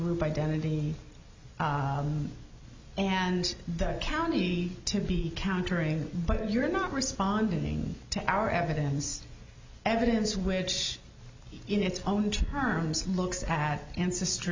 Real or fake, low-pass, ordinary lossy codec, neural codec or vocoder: real; 7.2 kHz; MP3, 64 kbps; none